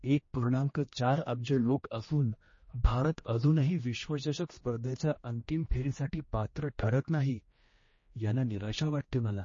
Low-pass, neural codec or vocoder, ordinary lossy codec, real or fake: 7.2 kHz; codec, 16 kHz, 1 kbps, X-Codec, HuBERT features, trained on general audio; MP3, 32 kbps; fake